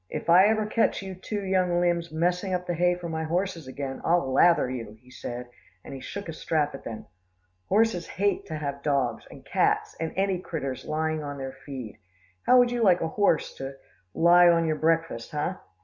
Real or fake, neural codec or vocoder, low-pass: real; none; 7.2 kHz